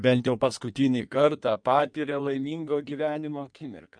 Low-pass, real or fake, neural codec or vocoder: 9.9 kHz; fake; codec, 16 kHz in and 24 kHz out, 1.1 kbps, FireRedTTS-2 codec